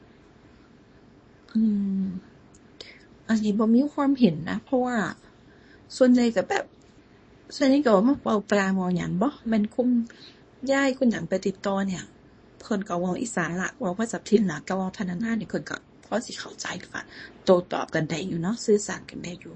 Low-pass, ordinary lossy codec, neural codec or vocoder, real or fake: 9.9 kHz; MP3, 32 kbps; codec, 24 kHz, 0.9 kbps, WavTokenizer, small release; fake